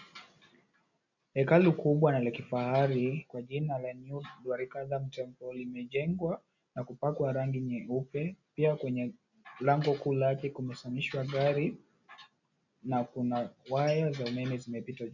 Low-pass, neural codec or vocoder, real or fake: 7.2 kHz; none; real